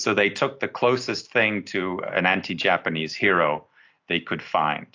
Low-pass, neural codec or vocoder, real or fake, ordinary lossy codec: 7.2 kHz; none; real; AAC, 48 kbps